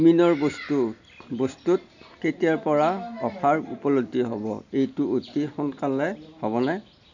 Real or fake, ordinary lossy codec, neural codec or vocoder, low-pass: real; none; none; 7.2 kHz